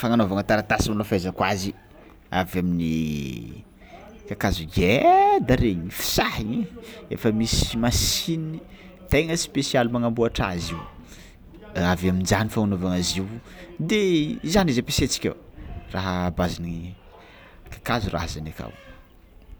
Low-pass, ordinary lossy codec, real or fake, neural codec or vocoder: none; none; real; none